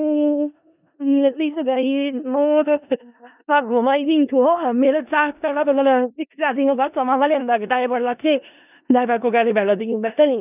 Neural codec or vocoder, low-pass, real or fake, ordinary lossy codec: codec, 16 kHz in and 24 kHz out, 0.4 kbps, LongCat-Audio-Codec, four codebook decoder; 3.6 kHz; fake; none